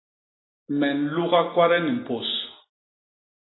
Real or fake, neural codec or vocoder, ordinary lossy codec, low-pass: real; none; AAC, 16 kbps; 7.2 kHz